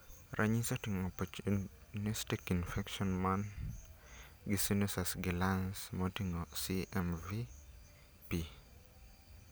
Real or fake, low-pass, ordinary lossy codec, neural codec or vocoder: real; none; none; none